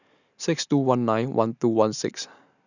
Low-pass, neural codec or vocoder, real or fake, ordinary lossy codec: 7.2 kHz; none; real; none